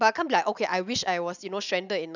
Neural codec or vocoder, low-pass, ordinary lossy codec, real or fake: none; 7.2 kHz; none; real